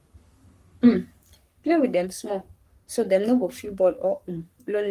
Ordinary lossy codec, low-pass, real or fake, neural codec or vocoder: Opus, 32 kbps; 14.4 kHz; fake; codec, 44.1 kHz, 3.4 kbps, Pupu-Codec